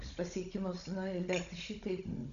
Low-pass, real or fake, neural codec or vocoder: 7.2 kHz; fake; codec, 16 kHz, 16 kbps, FunCodec, trained on Chinese and English, 50 frames a second